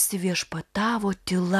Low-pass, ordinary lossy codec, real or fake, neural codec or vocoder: 14.4 kHz; AAC, 96 kbps; real; none